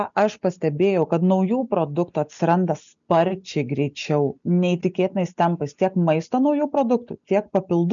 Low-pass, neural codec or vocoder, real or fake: 7.2 kHz; none; real